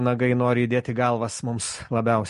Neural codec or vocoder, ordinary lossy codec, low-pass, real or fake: none; MP3, 48 kbps; 14.4 kHz; real